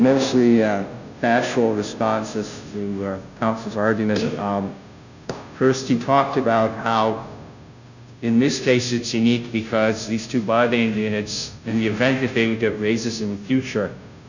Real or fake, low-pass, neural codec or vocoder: fake; 7.2 kHz; codec, 16 kHz, 0.5 kbps, FunCodec, trained on Chinese and English, 25 frames a second